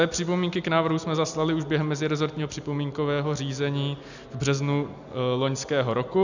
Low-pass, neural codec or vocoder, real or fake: 7.2 kHz; none; real